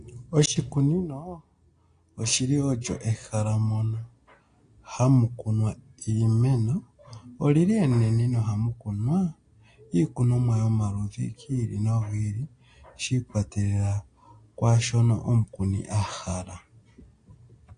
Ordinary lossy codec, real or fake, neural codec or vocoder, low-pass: AAC, 48 kbps; real; none; 9.9 kHz